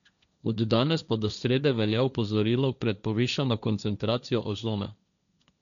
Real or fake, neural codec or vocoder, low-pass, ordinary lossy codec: fake; codec, 16 kHz, 1.1 kbps, Voila-Tokenizer; 7.2 kHz; none